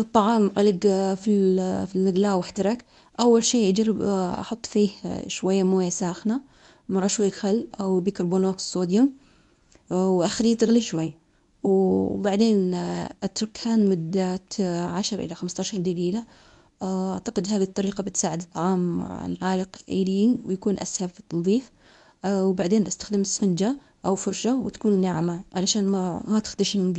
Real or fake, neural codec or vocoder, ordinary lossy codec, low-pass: fake; codec, 24 kHz, 0.9 kbps, WavTokenizer, medium speech release version 1; MP3, 96 kbps; 10.8 kHz